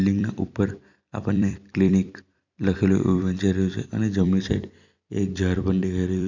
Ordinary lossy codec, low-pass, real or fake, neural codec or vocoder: none; 7.2 kHz; fake; vocoder, 44.1 kHz, 128 mel bands every 256 samples, BigVGAN v2